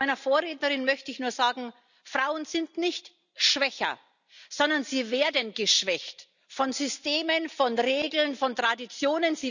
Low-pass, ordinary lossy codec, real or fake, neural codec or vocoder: 7.2 kHz; none; real; none